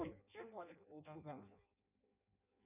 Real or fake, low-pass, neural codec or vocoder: fake; 3.6 kHz; codec, 16 kHz in and 24 kHz out, 0.6 kbps, FireRedTTS-2 codec